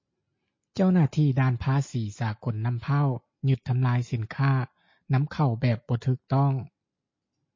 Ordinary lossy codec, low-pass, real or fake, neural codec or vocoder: MP3, 32 kbps; 7.2 kHz; real; none